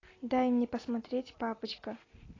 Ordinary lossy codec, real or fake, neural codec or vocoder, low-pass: AAC, 32 kbps; real; none; 7.2 kHz